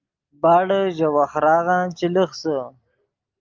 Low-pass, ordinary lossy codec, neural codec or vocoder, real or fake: 7.2 kHz; Opus, 24 kbps; none; real